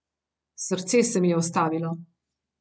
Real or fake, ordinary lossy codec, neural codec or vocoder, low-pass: real; none; none; none